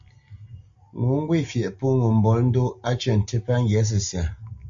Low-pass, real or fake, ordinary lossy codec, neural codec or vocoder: 7.2 kHz; real; AAC, 64 kbps; none